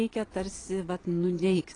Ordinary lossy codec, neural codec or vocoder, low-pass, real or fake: AAC, 32 kbps; vocoder, 22.05 kHz, 80 mel bands, Vocos; 9.9 kHz; fake